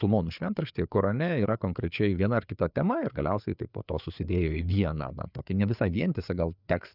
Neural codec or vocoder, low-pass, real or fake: codec, 16 kHz, 4 kbps, FreqCodec, larger model; 5.4 kHz; fake